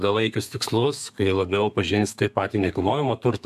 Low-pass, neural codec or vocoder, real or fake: 14.4 kHz; codec, 32 kHz, 1.9 kbps, SNAC; fake